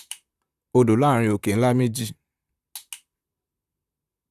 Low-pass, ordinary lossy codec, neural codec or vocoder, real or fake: 14.4 kHz; Opus, 64 kbps; vocoder, 44.1 kHz, 128 mel bands, Pupu-Vocoder; fake